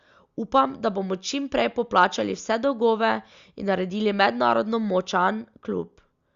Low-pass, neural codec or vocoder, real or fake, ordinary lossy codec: 7.2 kHz; none; real; Opus, 64 kbps